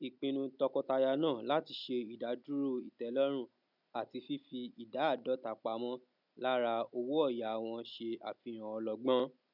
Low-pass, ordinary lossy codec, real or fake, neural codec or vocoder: 5.4 kHz; none; real; none